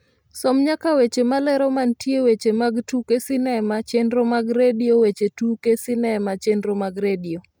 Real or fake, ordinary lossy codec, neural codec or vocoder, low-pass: real; none; none; none